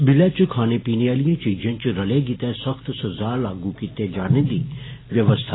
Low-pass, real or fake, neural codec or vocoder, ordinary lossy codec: 7.2 kHz; real; none; AAC, 16 kbps